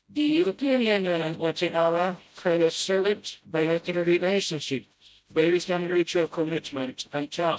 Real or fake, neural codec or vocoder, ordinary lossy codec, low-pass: fake; codec, 16 kHz, 0.5 kbps, FreqCodec, smaller model; none; none